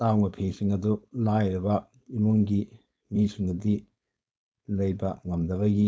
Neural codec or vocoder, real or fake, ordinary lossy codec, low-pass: codec, 16 kHz, 4.8 kbps, FACodec; fake; none; none